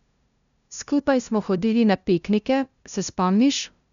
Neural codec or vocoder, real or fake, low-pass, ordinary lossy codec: codec, 16 kHz, 0.5 kbps, FunCodec, trained on LibriTTS, 25 frames a second; fake; 7.2 kHz; none